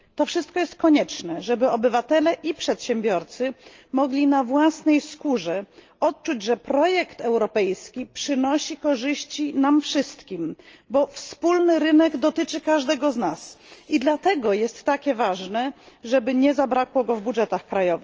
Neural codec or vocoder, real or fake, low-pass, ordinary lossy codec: none; real; 7.2 kHz; Opus, 24 kbps